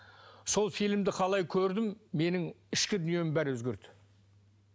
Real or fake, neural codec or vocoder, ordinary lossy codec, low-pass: real; none; none; none